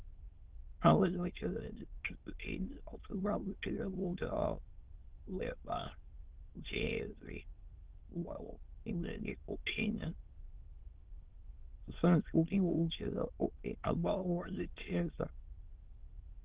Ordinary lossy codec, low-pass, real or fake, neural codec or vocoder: Opus, 16 kbps; 3.6 kHz; fake; autoencoder, 22.05 kHz, a latent of 192 numbers a frame, VITS, trained on many speakers